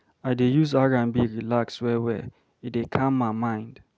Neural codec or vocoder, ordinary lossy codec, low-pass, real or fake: none; none; none; real